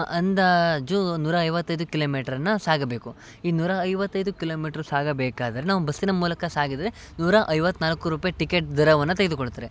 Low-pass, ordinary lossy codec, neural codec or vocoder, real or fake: none; none; none; real